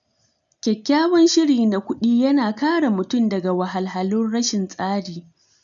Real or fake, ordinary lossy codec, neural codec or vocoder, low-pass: real; none; none; 7.2 kHz